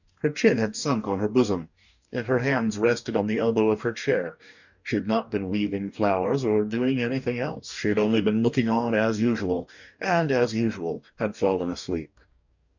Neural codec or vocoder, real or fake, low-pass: codec, 44.1 kHz, 2.6 kbps, DAC; fake; 7.2 kHz